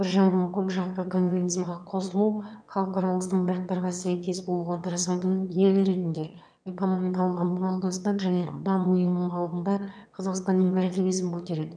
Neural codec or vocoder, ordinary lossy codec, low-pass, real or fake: autoencoder, 22.05 kHz, a latent of 192 numbers a frame, VITS, trained on one speaker; none; 9.9 kHz; fake